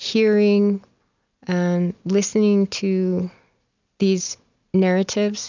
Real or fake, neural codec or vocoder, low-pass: real; none; 7.2 kHz